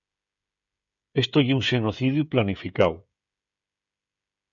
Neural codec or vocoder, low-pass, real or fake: codec, 16 kHz, 16 kbps, FreqCodec, smaller model; 7.2 kHz; fake